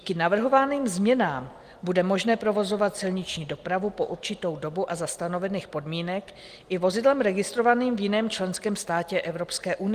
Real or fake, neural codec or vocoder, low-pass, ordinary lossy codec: real; none; 14.4 kHz; Opus, 24 kbps